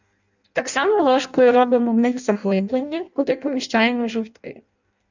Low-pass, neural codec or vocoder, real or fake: 7.2 kHz; codec, 16 kHz in and 24 kHz out, 0.6 kbps, FireRedTTS-2 codec; fake